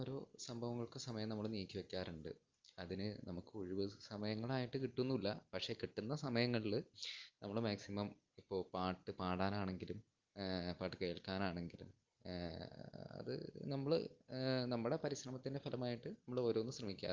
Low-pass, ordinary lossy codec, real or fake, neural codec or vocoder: 7.2 kHz; Opus, 32 kbps; real; none